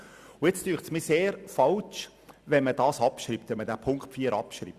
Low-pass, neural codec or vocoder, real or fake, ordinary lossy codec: 14.4 kHz; none; real; Opus, 64 kbps